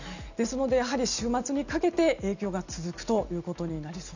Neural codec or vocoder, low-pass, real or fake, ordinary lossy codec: none; 7.2 kHz; real; none